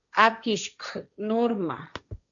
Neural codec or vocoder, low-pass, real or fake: codec, 16 kHz, 1.1 kbps, Voila-Tokenizer; 7.2 kHz; fake